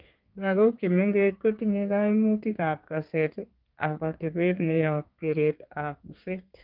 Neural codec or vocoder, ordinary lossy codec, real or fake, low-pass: codec, 32 kHz, 1.9 kbps, SNAC; Opus, 24 kbps; fake; 5.4 kHz